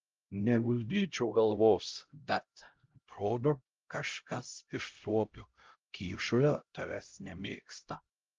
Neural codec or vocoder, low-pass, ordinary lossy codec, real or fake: codec, 16 kHz, 0.5 kbps, X-Codec, HuBERT features, trained on LibriSpeech; 7.2 kHz; Opus, 32 kbps; fake